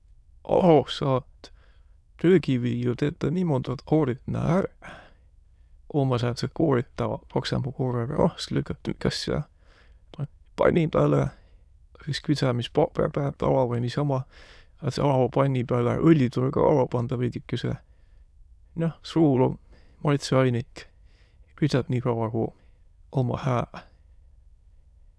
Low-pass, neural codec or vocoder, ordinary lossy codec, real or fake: none; autoencoder, 22.05 kHz, a latent of 192 numbers a frame, VITS, trained on many speakers; none; fake